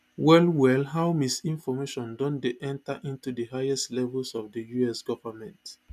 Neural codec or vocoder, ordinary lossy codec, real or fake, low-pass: none; none; real; 14.4 kHz